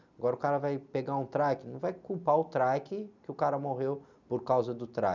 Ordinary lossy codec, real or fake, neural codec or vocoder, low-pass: none; real; none; 7.2 kHz